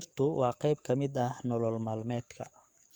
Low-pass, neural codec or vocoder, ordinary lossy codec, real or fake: 19.8 kHz; codec, 44.1 kHz, 7.8 kbps, DAC; Opus, 64 kbps; fake